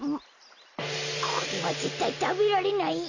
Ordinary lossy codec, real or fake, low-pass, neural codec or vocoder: none; real; 7.2 kHz; none